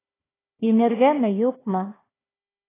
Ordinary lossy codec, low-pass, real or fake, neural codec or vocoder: AAC, 16 kbps; 3.6 kHz; fake; codec, 16 kHz, 1 kbps, FunCodec, trained on Chinese and English, 50 frames a second